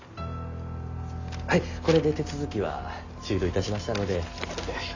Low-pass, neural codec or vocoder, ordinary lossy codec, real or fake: 7.2 kHz; none; Opus, 64 kbps; real